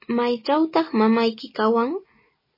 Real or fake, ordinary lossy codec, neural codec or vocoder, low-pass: real; MP3, 24 kbps; none; 5.4 kHz